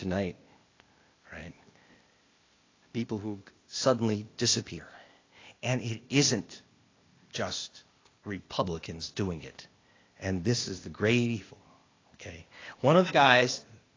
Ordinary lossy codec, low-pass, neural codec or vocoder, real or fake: AAC, 32 kbps; 7.2 kHz; codec, 16 kHz, 0.8 kbps, ZipCodec; fake